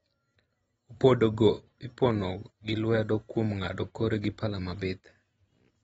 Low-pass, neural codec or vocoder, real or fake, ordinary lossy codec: 19.8 kHz; none; real; AAC, 24 kbps